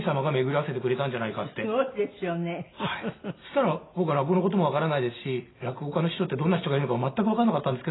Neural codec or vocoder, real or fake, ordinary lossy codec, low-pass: none; real; AAC, 16 kbps; 7.2 kHz